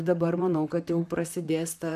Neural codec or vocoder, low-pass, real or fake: vocoder, 44.1 kHz, 128 mel bands, Pupu-Vocoder; 14.4 kHz; fake